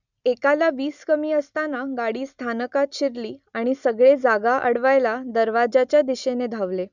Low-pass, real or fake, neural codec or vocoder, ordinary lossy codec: 7.2 kHz; real; none; none